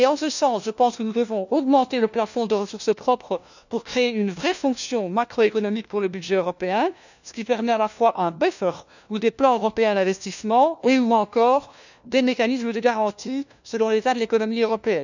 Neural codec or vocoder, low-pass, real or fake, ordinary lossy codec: codec, 16 kHz, 1 kbps, FunCodec, trained on LibriTTS, 50 frames a second; 7.2 kHz; fake; none